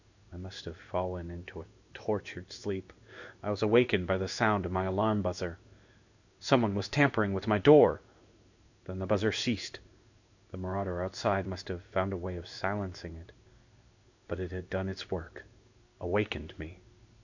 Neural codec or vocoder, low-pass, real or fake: codec, 16 kHz in and 24 kHz out, 1 kbps, XY-Tokenizer; 7.2 kHz; fake